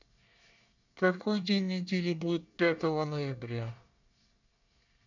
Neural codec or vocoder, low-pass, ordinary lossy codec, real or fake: codec, 24 kHz, 1 kbps, SNAC; 7.2 kHz; none; fake